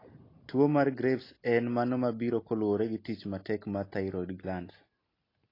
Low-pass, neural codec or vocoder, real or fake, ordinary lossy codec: 5.4 kHz; none; real; AAC, 24 kbps